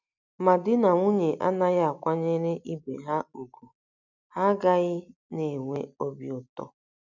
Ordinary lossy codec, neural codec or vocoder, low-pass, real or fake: none; none; 7.2 kHz; real